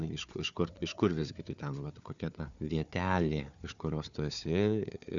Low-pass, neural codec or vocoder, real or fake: 7.2 kHz; codec, 16 kHz, 4 kbps, FunCodec, trained on Chinese and English, 50 frames a second; fake